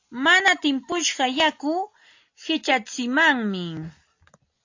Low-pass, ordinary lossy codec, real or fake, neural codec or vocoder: 7.2 kHz; AAC, 48 kbps; real; none